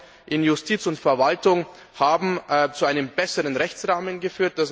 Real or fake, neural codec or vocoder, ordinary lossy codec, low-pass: real; none; none; none